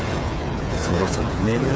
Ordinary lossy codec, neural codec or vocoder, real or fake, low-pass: none; codec, 16 kHz, 8 kbps, FreqCodec, smaller model; fake; none